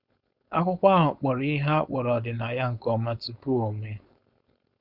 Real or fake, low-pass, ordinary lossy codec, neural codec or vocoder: fake; 5.4 kHz; Opus, 64 kbps; codec, 16 kHz, 4.8 kbps, FACodec